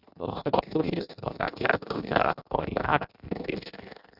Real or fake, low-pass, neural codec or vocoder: fake; 5.4 kHz; codec, 16 kHz in and 24 kHz out, 0.6 kbps, FireRedTTS-2 codec